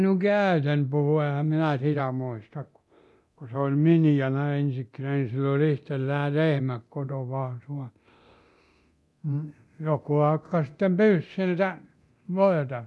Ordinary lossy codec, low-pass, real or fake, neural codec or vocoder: none; none; fake; codec, 24 kHz, 0.9 kbps, DualCodec